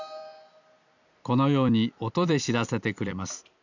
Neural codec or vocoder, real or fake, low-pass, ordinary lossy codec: vocoder, 44.1 kHz, 128 mel bands every 256 samples, BigVGAN v2; fake; 7.2 kHz; none